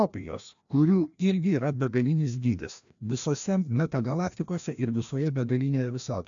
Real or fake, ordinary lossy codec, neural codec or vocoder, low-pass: fake; AAC, 64 kbps; codec, 16 kHz, 1 kbps, FreqCodec, larger model; 7.2 kHz